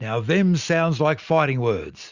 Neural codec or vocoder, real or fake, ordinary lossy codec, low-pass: none; real; Opus, 64 kbps; 7.2 kHz